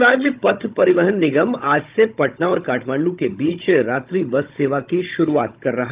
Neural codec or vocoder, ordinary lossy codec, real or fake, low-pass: codec, 16 kHz, 16 kbps, FunCodec, trained on Chinese and English, 50 frames a second; Opus, 32 kbps; fake; 3.6 kHz